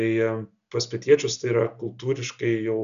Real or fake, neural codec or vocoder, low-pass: real; none; 7.2 kHz